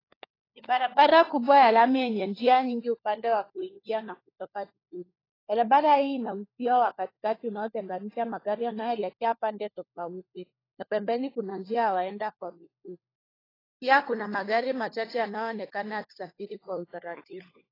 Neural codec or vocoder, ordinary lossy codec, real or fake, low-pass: codec, 16 kHz, 4 kbps, FunCodec, trained on LibriTTS, 50 frames a second; AAC, 24 kbps; fake; 5.4 kHz